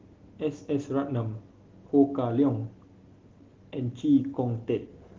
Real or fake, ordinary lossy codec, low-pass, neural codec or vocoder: real; Opus, 16 kbps; 7.2 kHz; none